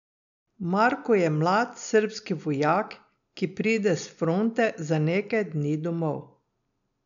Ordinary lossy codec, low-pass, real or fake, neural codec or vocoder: none; 7.2 kHz; real; none